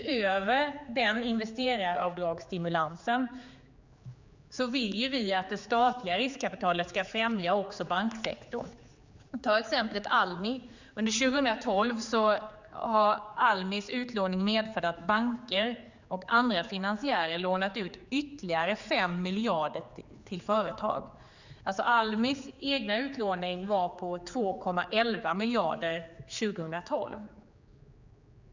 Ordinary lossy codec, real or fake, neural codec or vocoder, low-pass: Opus, 64 kbps; fake; codec, 16 kHz, 4 kbps, X-Codec, HuBERT features, trained on general audio; 7.2 kHz